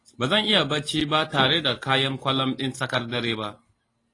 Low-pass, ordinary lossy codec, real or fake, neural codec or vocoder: 10.8 kHz; AAC, 32 kbps; real; none